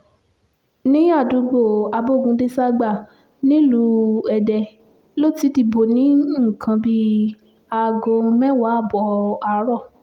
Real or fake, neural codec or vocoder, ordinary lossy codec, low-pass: real; none; Opus, 32 kbps; 19.8 kHz